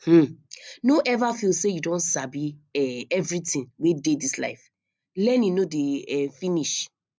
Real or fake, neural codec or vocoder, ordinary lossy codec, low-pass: real; none; none; none